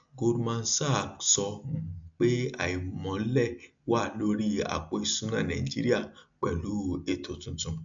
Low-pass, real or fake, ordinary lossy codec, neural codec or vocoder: 7.2 kHz; real; AAC, 64 kbps; none